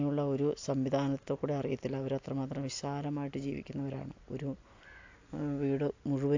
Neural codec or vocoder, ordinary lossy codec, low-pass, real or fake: none; AAC, 48 kbps; 7.2 kHz; real